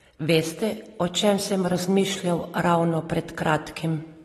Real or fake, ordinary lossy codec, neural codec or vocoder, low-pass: real; AAC, 32 kbps; none; 19.8 kHz